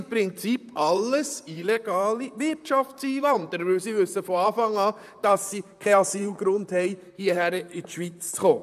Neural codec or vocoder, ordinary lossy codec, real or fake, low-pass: vocoder, 44.1 kHz, 128 mel bands, Pupu-Vocoder; none; fake; 14.4 kHz